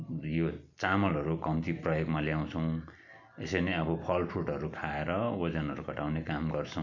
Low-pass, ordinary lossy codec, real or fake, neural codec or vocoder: 7.2 kHz; none; real; none